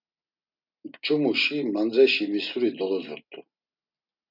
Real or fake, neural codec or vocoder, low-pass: real; none; 5.4 kHz